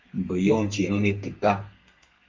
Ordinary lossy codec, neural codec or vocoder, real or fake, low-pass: Opus, 32 kbps; codec, 32 kHz, 1.9 kbps, SNAC; fake; 7.2 kHz